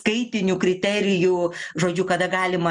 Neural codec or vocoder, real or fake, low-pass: none; real; 10.8 kHz